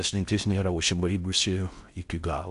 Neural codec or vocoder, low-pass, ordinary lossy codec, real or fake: codec, 16 kHz in and 24 kHz out, 0.6 kbps, FocalCodec, streaming, 4096 codes; 10.8 kHz; MP3, 96 kbps; fake